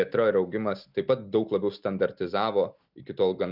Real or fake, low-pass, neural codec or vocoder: real; 5.4 kHz; none